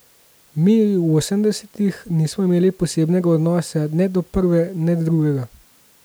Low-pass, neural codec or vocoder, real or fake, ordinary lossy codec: none; none; real; none